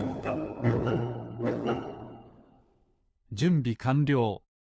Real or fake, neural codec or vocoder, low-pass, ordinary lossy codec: fake; codec, 16 kHz, 4 kbps, FunCodec, trained on LibriTTS, 50 frames a second; none; none